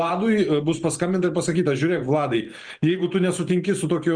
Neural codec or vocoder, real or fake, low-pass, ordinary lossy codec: vocoder, 44.1 kHz, 128 mel bands every 512 samples, BigVGAN v2; fake; 9.9 kHz; Opus, 32 kbps